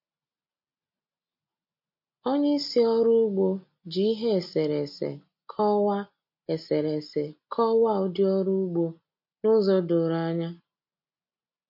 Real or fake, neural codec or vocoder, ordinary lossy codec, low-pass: real; none; MP3, 32 kbps; 5.4 kHz